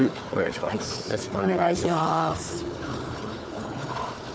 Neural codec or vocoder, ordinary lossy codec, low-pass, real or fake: codec, 16 kHz, 4 kbps, FunCodec, trained on Chinese and English, 50 frames a second; none; none; fake